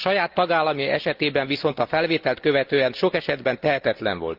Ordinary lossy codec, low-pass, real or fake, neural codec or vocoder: Opus, 24 kbps; 5.4 kHz; real; none